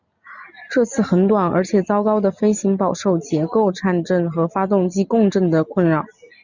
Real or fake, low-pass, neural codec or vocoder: real; 7.2 kHz; none